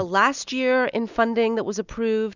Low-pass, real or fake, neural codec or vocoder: 7.2 kHz; real; none